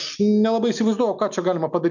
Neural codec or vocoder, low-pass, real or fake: none; 7.2 kHz; real